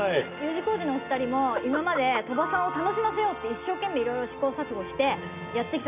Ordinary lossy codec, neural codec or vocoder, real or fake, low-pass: none; none; real; 3.6 kHz